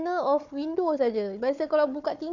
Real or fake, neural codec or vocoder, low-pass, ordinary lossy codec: fake; codec, 16 kHz, 4 kbps, FunCodec, trained on Chinese and English, 50 frames a second; 7.2 kHz; none